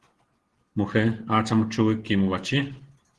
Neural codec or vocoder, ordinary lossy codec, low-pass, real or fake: none; Opus, 16 kbps; 10.8 kHz; real